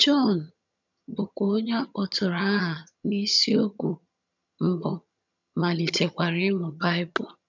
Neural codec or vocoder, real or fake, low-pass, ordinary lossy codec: vocoder, 22.05 kHz, 80 mel bands, HiFi-GAN; fake; 7.2 kHz; none